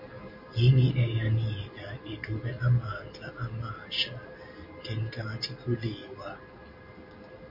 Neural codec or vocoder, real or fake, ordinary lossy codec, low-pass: none; real; MP3, 32 kbps; 5.4 kHz